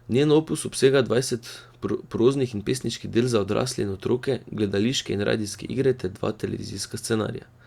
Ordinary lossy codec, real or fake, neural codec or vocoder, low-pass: Opus, 64 kbps; real; none; 19.8 kHz